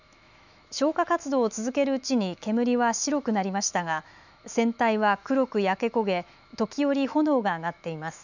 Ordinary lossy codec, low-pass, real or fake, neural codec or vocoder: none; 7.2 kHz; fake; autoencoder, 48 kHz, 128 numbers a frame, DAC-VAE, trained on Japanese speech